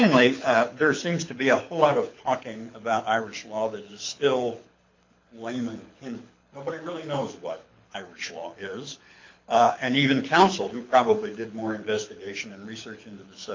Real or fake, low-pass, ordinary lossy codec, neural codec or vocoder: fake; 7.2 kHz; MP3, 48 kbps; codec, 24 kHz, 6 kbps, HILCodec